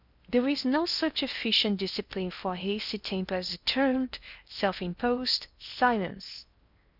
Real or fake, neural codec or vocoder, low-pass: fake; codec, 16 kHz in and 24 kHz out, 0.8 kbps, FocalCodec, streaming, 65536 codes; 5.4 kHz